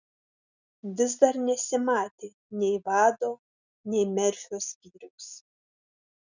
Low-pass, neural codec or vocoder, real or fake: 7.2 kHz; none; real